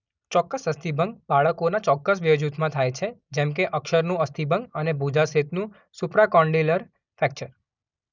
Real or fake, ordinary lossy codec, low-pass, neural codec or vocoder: real; none; 7.2 kHz; none